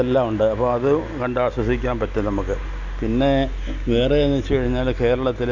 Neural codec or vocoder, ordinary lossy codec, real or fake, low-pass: none; none; real; 7.2 kHz